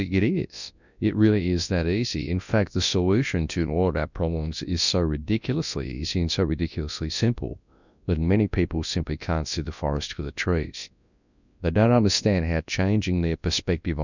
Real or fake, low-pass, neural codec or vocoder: fake; 7.2 kHz; codec, 24 kHz, 0.9 kbps, WavTokenizer, large speech release